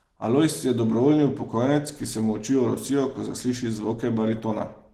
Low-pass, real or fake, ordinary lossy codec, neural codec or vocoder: 14.4 kHz; real; Opus, 16 kbps; none